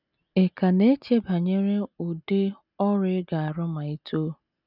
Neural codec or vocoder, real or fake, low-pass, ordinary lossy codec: none; real; 5.4 kHz; none